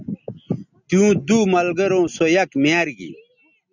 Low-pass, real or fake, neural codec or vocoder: 7.2 kHz; real; none